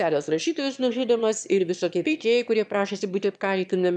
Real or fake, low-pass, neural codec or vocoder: fake; 9.9 kHz; autoencoder, 22.05 kHz, a latent of 192 numbers a frame, VITS, trained on one speaker